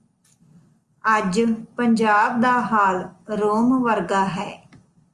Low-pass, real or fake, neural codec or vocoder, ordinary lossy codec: 10.8 kHz; real; none; Opus, 32 kbps